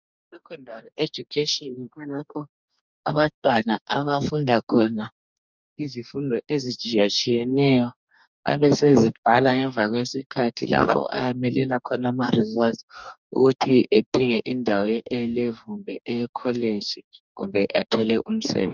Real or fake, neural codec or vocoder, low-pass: fake; codec, 44.1 kHz, 2.6 kbps, DAC; 7.2 kHz